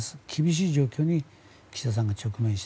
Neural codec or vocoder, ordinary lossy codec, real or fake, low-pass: none; none; real; none